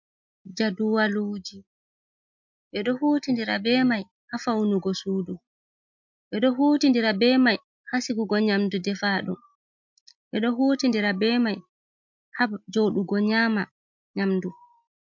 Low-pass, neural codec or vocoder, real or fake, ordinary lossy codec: 7.2 kHz; none; real; MP3, 48 kbps